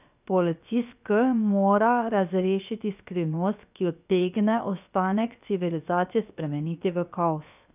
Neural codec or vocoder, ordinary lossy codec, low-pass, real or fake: codec, 16 kHz, 0.7 kbps, FocalCodec; none; 3.6 kHz; fake